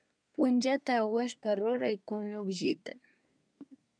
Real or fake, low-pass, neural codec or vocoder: fake; 9.9 kHz; codec, 24 kHz, 1 kbps, SNAC